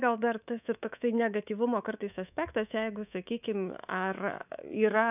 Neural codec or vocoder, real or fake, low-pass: codec, 24 kHz, 3.1 kbps, DualCodec; fake; 3.6 kHz